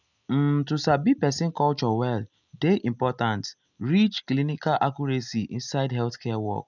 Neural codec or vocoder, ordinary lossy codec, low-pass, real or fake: none; none; 7.2 kHz; real